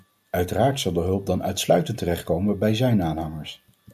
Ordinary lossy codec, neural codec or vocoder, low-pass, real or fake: MP3, 96 kbps; none; 14.4 kHz; real